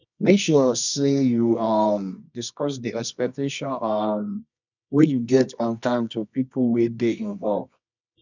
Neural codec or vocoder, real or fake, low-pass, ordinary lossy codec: codec, 24 kHz, 0.9 kbps, WavTokenizer, medium music audio release; fake; 7.2 kHz; none